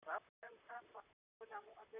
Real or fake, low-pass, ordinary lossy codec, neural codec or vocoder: fake; 3.6 kHz; none; vocoder, 44.1 kHz, 128 mel bands, Pupu-Vocoder